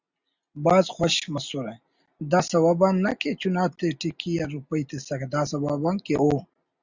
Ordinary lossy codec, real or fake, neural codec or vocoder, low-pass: Opus, 64 kbps; real; none; 7.2 kHz